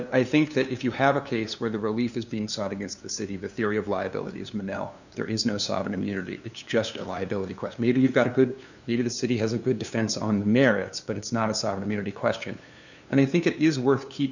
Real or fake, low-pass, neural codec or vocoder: fake; 7.2 kHz; codec, 16 kHz, 2 kbps, FunCodec, trained on LibriTTS, 25 frames a second